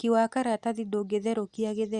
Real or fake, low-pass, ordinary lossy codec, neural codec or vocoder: real; 10.8 kHz; Opus, 64 kbps; none